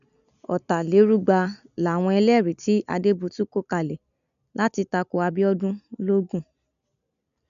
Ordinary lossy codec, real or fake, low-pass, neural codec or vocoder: none; real; 7.2 kHz; none